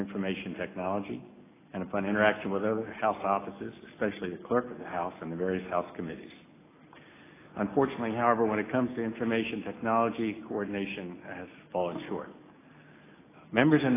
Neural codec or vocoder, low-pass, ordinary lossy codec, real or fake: none; 3.6 kHz; AAC, 16 kbps; real